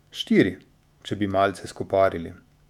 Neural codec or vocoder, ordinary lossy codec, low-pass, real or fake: autoencoder, 48 kHz, 128 numbers a frame, DAC-VAE, trained on Japanese speech; none; 19.8 kHz; fake